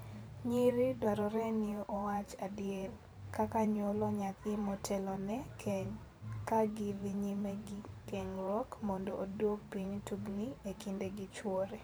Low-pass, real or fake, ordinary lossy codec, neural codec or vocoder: none; fake; none; vocoder, 44.1 kHz, 128 mel bands every 512 samples, BigVGAN v2